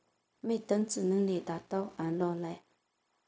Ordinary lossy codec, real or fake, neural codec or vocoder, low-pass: none; fake; codec, 16 kHz, 0.4 kbps, LongCat-Audio-Codec; none